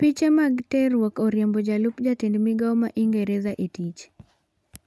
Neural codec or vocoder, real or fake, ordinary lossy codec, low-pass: none; real; none; none